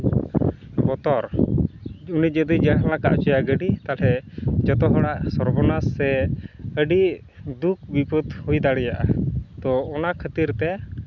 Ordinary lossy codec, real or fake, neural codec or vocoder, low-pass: none; real; none; 7.2 kHz